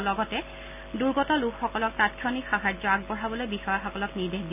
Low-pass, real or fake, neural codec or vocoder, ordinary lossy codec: 3.6 kHz; real; none; none